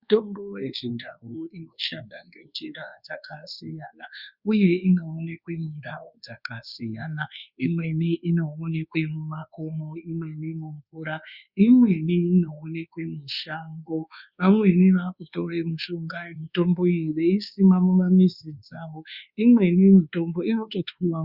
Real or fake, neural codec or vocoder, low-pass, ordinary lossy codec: fake; codec, 24 kHz, 1.2 kbps, DualCodec; 5.4 kHz; Opus, 64 kbps